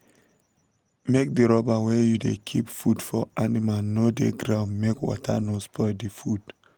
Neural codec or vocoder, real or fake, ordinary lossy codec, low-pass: none; real; Opus, 24 kbps; 19.8 kHz